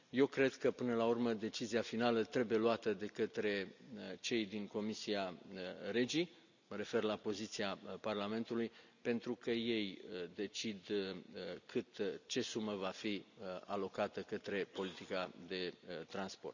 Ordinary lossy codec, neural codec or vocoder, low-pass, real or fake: none; none; 7.2 kHz; real